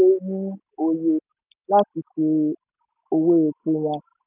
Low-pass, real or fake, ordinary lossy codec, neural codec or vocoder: 3.6 kHz; real; none; none